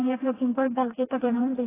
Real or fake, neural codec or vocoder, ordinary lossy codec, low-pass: fake; codec, 16 kHz, 1 kbps, FreqCodec, smaller model; AAC, 16 kbps; 3.6 kHz